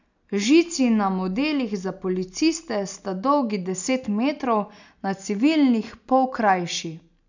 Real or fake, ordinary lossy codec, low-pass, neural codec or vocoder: real; none; 7.2 kHz; none